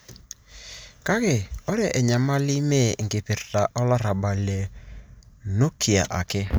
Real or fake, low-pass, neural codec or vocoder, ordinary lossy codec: real; none; none; none